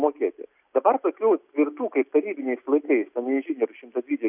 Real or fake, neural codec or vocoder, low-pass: real; none; 3.6 kHz